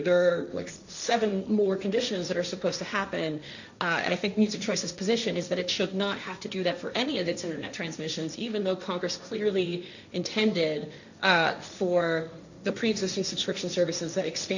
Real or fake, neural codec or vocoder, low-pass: fake; codec, 16 kHz, 1.1 kbps, Voila-Tokenizer; 7.2 kHz